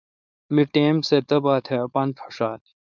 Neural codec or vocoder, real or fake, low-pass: codec, 16 kHz, 4.8 kbps, FACodec; fake; 7.2 kHz